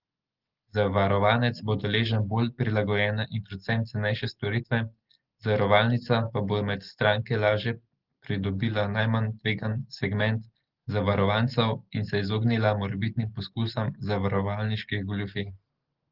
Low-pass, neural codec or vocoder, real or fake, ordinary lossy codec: 5.4 kHz; none; real; Opus, 16 kbps